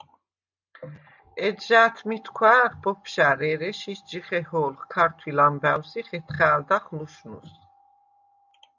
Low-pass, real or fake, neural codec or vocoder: 7.2 kHz; real; none